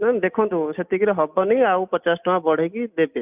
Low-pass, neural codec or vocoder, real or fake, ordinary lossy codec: 3.6 kHz; none; real; none